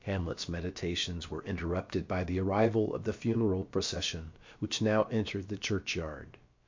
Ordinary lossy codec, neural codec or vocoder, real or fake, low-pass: MP3, 48 kbps; codec, 16 kHz, about 1 kbps, DyCAST, with the encoder's durations; fake; 7.2 kHz